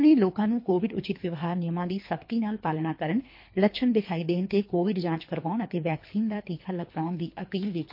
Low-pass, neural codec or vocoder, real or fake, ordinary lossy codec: 5.4 kHz; codec, 24 kHz, 3 kbps, HILCodec; fake; MP3, 32 kbps